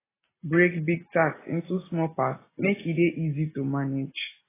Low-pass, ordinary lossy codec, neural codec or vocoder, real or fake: 3.6 kHz; AAC, 16 kbps; none; real